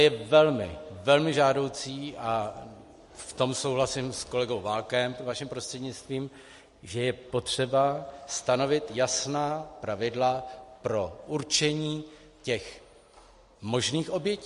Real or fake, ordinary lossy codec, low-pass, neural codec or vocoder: real; MP3, 48 kbps; 14.4 kHz; none